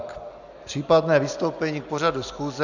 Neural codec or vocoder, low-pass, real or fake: none; 7.2 kHz; real